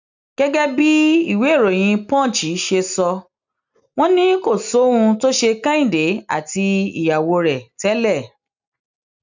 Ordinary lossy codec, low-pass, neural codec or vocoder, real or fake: none; 7.2 kHz; none; real